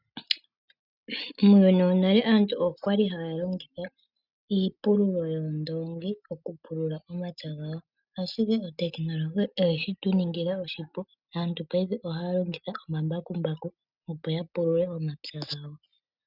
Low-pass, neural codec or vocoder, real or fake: 5.4 kHz; none; real